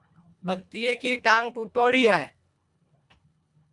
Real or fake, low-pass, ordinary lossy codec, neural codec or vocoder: fake; 10.8 kHz; MP3, 96 kbps; codec, 24 kHz, 1.5 kbps, HILCodec